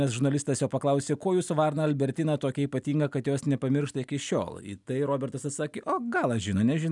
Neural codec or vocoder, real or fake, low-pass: none; real; 10.8 kHz